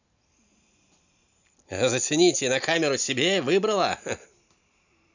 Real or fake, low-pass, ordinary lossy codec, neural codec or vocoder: real; 7.2 kHz; none; none